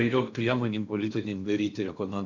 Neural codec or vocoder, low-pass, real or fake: codec, 16 kHz in and 24 kHz out, 0.8 kbps, FocalCodec, streaming, 65536 codes; 7.2 kHz; fake